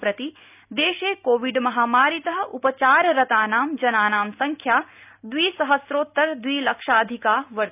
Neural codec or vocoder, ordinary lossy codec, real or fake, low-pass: none; none; real; 3.6 kHz